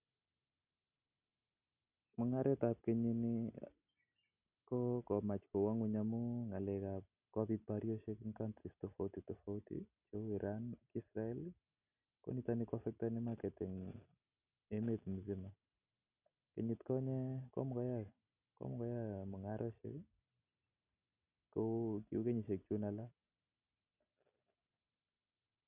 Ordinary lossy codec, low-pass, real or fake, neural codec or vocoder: none; 3.6 kHz; real; none